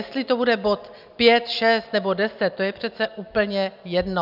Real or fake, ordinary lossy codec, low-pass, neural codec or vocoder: real; AAC, 48 kbps; 5.4 kHz; none